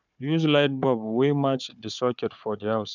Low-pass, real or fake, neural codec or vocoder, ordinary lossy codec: 7.2 kHz; fake; codec, 16 kHz, 4 kbps, FunCodec, trained on Chinese and English, 50 frames a second; none